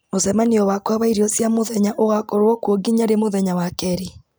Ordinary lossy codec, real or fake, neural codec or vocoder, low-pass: none; real; none; none